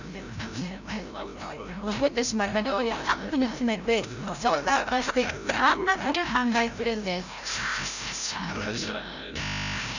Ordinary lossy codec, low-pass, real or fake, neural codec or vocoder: none; 7.2 kHz; fake; codec, 16 kHz, 0.5 kbps, FreqCodec, larger model